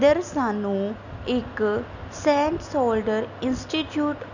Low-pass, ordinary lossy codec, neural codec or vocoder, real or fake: 7.2 kHz; none; none; real